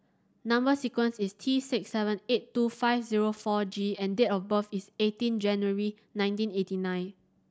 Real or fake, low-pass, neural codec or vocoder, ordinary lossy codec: real; none; none; none